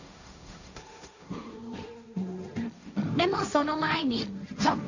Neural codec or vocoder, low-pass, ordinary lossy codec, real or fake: codec, 16 kHz, 1.1 kbps, Voila-Tokenizer; 7.2 kHz; none; fake